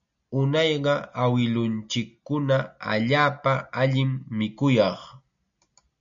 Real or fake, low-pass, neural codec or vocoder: real; 7.2 kHz; none